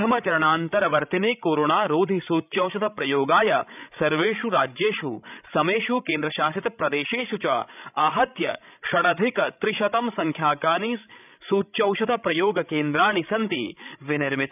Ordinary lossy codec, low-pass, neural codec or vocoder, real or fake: none; 3.6 kHz; codec, 16 kHz, 16 kbps, FreqCodec, larger model; fake